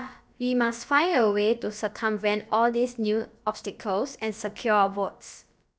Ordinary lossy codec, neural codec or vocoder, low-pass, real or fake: none; codec, 16 kHz, about 1 kbps, DyCAST, with the encoder's durations; none; fake